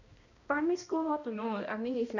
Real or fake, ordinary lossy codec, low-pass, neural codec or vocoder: fake; none; 7.2 kHz; codec, 16 kHz, 1 kbps, X-Codec, HuBERT features, trained on balanced general audio